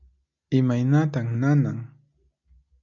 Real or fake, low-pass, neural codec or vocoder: real; 7.2 kHz; none